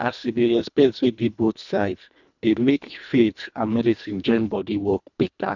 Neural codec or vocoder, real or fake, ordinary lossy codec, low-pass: codec, 24 kHz, 1.5 kbps, HILCodec; fake; none; 7.2 kHz